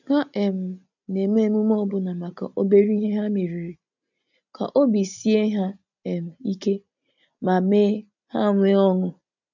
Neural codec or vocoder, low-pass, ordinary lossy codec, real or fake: none; 7.2 kHz; none; real